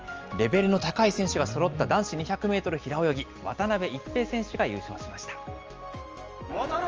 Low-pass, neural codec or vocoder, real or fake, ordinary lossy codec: 7.2 kHz; none; real; Opus, 24 kbps